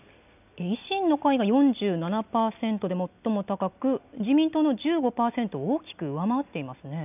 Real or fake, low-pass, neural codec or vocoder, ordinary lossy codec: real; 3.6 kHz; none; none